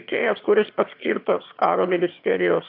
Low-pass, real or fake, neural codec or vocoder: 5.4 kHz; fake; autoencoder, 22.05 kHz, a latent of 192 numbers a frame, VITS, trained on one speaker